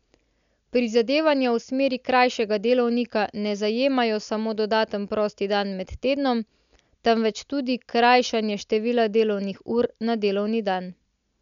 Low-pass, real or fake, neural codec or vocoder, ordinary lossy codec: 7.2 kHz; real; none; none